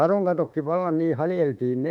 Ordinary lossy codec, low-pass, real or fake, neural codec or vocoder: none; 19.8 kHz; fake; autoencoder, 48 kHz, 32 numbers a frame, DAC-VAE, trained on Japanese speech